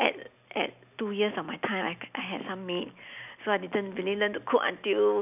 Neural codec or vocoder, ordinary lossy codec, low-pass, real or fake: none; none; 3.6 kHz; real